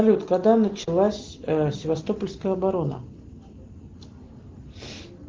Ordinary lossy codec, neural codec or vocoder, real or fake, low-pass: Opus, 16 kbps; none; real; 7.2 kHz